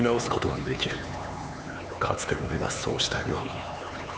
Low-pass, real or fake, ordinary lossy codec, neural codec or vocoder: none; fake; none; codec, 16 kHz, 4 kbps, X-Codec, HuBERT features, trained on LibriSpeech